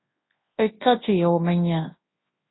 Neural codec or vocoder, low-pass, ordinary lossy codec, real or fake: codec, 24 kHz, 0.9 kbps, WavTokenizer, large speech release; 7.2 kHz; AAC, 16 kbps; fake